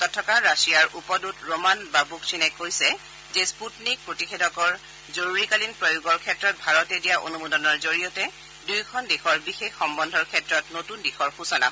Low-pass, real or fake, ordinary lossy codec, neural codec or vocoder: none; real; none; none